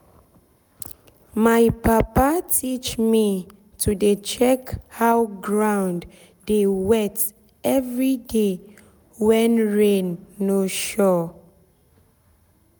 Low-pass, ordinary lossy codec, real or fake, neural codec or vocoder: none; none; real; none